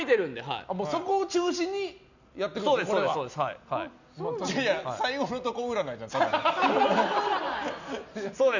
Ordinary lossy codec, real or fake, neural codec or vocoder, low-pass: none; real; none; 7.2 kHz